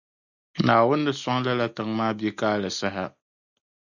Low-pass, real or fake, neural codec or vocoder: 7.2 kHz; real; none